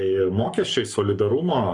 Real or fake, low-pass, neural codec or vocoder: fake; 10.8 kHz; codec, 44.1 kHz, 7.8 kbps, Pupu-Codec